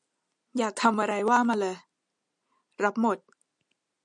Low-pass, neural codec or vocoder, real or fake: 9.9 kHz; none; real